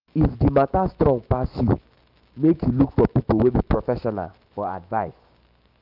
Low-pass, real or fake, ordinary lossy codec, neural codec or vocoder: 5.4 kHz; fake; Opus, 24 kbps; autoencoder, 48 kHz, 128 numbers a frame, DAC-VAE, trained on Japanese speech